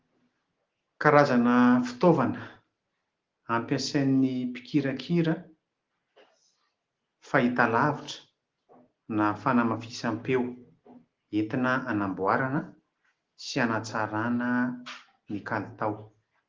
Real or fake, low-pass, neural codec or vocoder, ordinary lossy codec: real; 7.2 kHz; none; Opus, 16 kbps